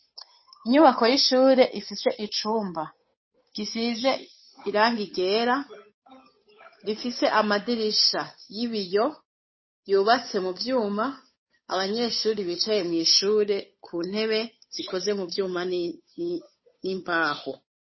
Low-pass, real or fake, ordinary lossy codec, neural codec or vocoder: 7.2 kHz; fake; MP3, 24 kbps; codec, 16 kHz, 8 kbps, FunCodec, trained on Chinese and English, 25 frames a second